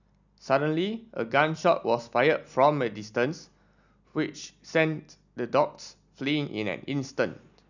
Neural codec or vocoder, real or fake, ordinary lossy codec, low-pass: none; real; none; 7.2 kHz